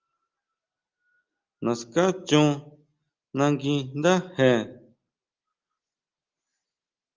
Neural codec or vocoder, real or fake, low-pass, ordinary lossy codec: none; real; 7.2 kHz; Opus, 32 kbps